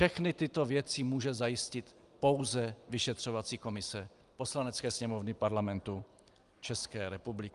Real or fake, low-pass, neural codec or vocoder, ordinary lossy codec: real; 10.8 kHz; none; Opus, 32 kbps